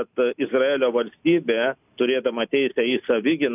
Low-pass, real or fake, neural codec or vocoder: 3.6 kHz; real; none